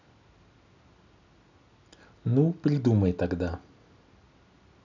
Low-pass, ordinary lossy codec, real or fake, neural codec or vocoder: 7.2 kHz; none; real; none